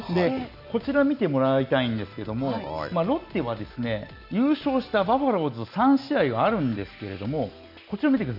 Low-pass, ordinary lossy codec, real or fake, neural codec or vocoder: 5.4 kHz; none; real; none